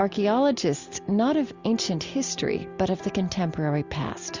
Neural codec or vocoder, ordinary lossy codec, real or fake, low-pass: none; Opus, 64 kbps; real; 7.2 kHz